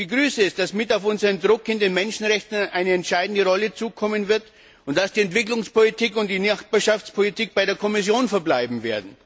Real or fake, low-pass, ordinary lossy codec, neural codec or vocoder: real; none; none; none